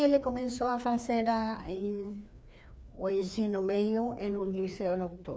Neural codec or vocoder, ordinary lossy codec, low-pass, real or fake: codec, 16 kHz, 2 kbps, FreqCodec, larger model; none; none; fake